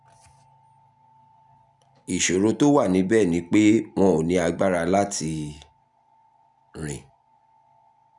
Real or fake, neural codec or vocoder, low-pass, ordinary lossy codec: real; none; 10.8 kHz; none